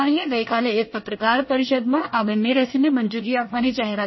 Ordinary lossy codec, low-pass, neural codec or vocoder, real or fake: MP3, 24 kbps; 7.2 kHz; codec, 24 kHz, 1 kbps, SNAC; fake